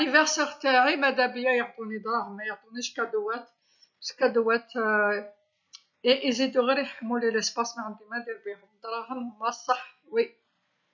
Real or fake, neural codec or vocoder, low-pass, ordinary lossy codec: real; none; 7.2 kHz; none